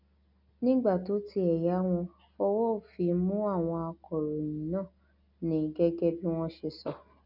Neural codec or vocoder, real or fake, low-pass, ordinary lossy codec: none; real; 5.4 kHz; none